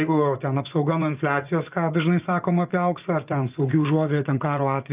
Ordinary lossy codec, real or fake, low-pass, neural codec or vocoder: Opus, 24 kbps; fake; 3.6 kHz; vocoder, 24 kHz, 100 mel bands, Vocos